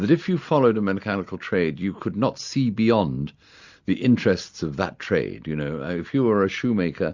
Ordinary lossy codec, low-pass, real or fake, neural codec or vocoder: Opus, 64 kbps; 7.2 kHz; real; none